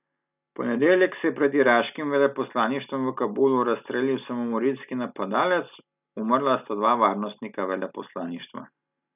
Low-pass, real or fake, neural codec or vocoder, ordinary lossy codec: 3.6 kHz; real; none; none